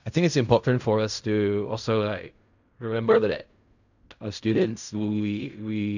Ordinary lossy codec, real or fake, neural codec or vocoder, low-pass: none; fake; codec, 16 kHz in and 24 kHz out, 0.4 kbps, LongCat-Audio-Codec, fine tuned four codebook decoder; 7.2 kHz